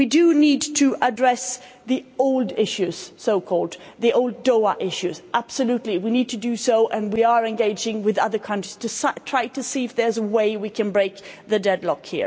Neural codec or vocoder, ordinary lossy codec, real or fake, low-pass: none; none; real; none